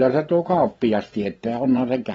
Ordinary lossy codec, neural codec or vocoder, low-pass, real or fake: AAC, 24 kbps; vocoder, 24 kHz, 100 mel bands, Vocos; 10.8 kHz; fake